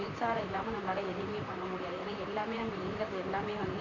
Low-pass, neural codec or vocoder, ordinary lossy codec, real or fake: 7.2 kHz; vocoder, 44.1 kHz, 128 mel bands, Pupu-Vocoder; none; fake